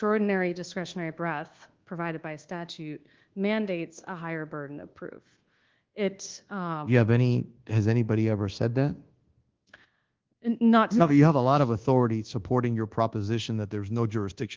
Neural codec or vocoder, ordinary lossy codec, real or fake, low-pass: codec, 24 kHz, 1.2 kbps, DualCodec; Opus, 16 kbps; fake; 7.2 kHz